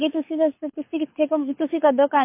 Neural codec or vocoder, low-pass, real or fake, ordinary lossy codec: codec, 24 kHz, 6 kbps, HILCodec; 3.6 kHz; fake; MP3, 24 kbps